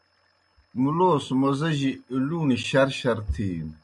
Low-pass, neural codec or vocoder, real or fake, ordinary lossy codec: 10.8 kHz; none; real; MP3, 96 kbps